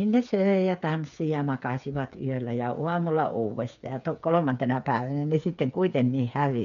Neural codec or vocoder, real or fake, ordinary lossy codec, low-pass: codec, 16 kHz, 8 kbps, FreqCodec, smaller model; fake; none; 7.2 kHz